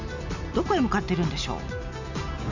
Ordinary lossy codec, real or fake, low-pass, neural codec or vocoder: none; real; 7.2 kHz; none